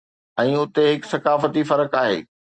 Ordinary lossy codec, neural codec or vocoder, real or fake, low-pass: Opus, 64 kbps; none; real; 9.9 kHz